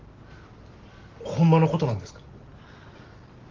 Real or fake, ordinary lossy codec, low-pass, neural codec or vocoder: real; Opus, 16 kbps; 7.2 kHz; none